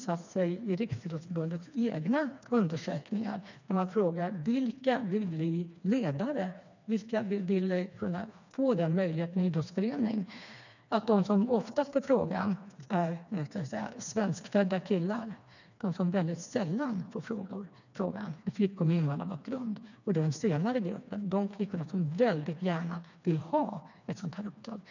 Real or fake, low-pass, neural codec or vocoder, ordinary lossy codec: fake; 7.2 kHz; codec, 16 kHz, 2 kbps, FreqCodec, smaller model; none